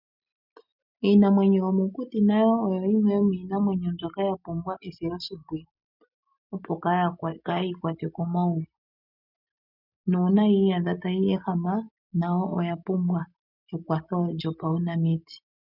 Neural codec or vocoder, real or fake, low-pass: none; real; 5.4 kHz